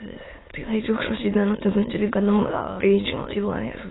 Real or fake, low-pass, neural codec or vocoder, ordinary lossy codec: fake; 7.2 kHz; autoencoder, 22.05 kHz, a latent of 192 numbers a frame, VITS, trained on many speakers; AAC, 16 kbps